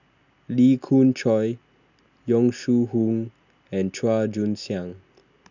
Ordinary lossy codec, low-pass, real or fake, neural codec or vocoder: none; 7.2 kHz; real; none